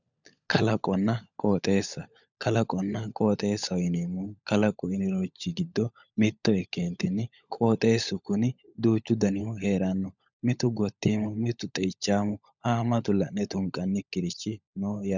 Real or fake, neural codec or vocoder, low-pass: fake; codec, 16 kHz, 16 kbps, FunCodec, trained on LibriTTS, 50 frames a second; 7.2 kHz